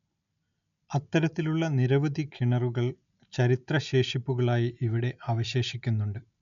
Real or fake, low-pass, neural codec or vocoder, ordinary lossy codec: real; 7.2 kHz; none; none